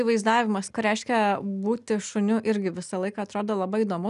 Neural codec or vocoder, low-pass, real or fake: none; 10.8 kHz; real